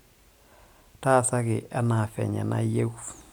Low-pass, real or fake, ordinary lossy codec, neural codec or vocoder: none; real; none; none